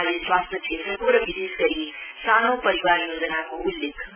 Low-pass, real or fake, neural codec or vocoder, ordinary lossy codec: 3.6 kHz; real; none; none